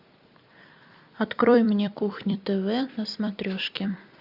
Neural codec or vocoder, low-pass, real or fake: vocoder, 44.1 kHz, 128 mel bands every 256 samples, BigVGAN v2; 5.4 kHz; fake